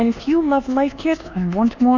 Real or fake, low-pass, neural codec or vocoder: fake; 7.2 kHz; codec, 24 kHz, 1.2 kbps, DualCodec